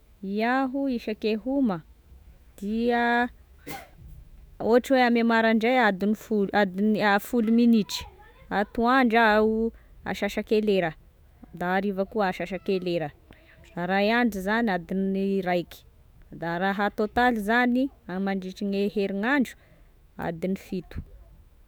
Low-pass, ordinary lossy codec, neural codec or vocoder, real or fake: none; none; autoencoder, 48 kHz, 128 numbers a frame, DAC-VAE, trained on Japanese speech; fake